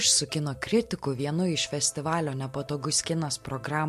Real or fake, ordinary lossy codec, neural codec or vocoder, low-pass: real; MP3, 64 kbps; none; 14.4 kHz